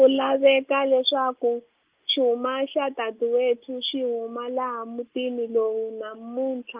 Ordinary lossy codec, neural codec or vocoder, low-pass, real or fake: Opus, 24 kbps; none; 3.6 kHz; real